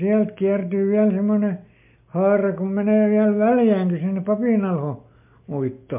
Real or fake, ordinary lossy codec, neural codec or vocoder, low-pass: real; MP3, 32 kbps; none; 3.6 kHz